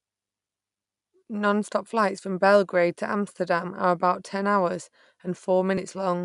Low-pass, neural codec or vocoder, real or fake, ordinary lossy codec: 10.8 kHz; none; real; none